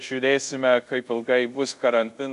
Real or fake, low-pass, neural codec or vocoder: fake; 10.8 kHz; codec, 24 kHz, 0.5 kbps, DualCodec